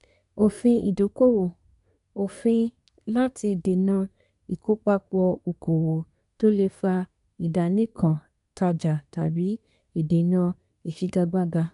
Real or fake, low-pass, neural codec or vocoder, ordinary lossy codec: fake; 10.8 kHz; codec, 24 kHz, 1 kbps, SNAC; none